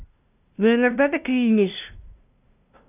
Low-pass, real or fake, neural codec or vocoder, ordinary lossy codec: 3.6 kHz; fake; codec, 16 kHz, 0.5 kbps, FunCodec, trained on LibriTTS, 25 frames a second; none